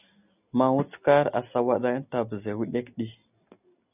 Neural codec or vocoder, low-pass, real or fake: none; 3.6 kHz; real